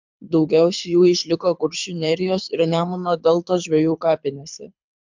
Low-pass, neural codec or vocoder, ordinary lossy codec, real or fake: 7.2 kHz; codec, 24 kHz, 6 kbps, HILCodec; MP3, 64 kbps; fake